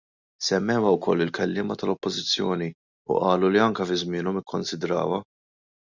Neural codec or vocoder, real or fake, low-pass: none; real; 7.2 kHz